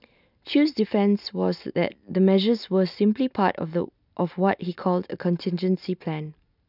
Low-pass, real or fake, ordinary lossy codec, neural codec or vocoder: 5.4 kHz; real; none; none